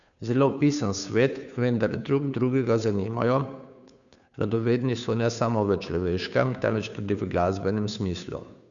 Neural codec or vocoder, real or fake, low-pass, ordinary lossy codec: codec, 16 kHz, 2 kbps, FunCodec, trained on Chinese and English, 25 frames a second; fake; 7.2 kHz; none